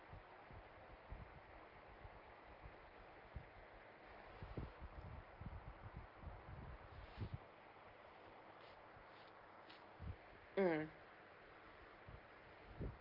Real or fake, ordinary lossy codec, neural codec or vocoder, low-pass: real; Opus, 32 kbps; none; 5.4 kHz